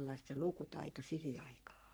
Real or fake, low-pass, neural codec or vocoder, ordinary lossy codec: fake; none; codec, 44.1 kHz, 3.4 kbps, Pupu-Codec; none